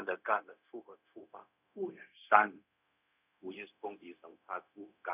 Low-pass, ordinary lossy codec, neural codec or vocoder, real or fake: 3.6 kHz; none; codec, 16 kHz, 0.4 kbps, LongCat-Audio-Codec; fake